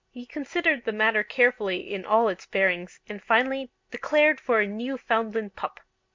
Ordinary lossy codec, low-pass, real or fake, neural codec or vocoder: MP3, 64 kbps; 7.2 kHz; real; none